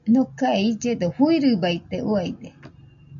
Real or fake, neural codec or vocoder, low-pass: real; none; 7.2 kHz